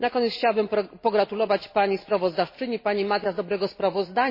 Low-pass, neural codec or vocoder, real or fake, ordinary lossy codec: 5.4 kHz; none; real; MP3, 24 kbps